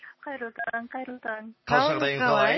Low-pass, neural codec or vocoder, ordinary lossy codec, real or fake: 7.2 kHz; none; MP3, 24 kbps; real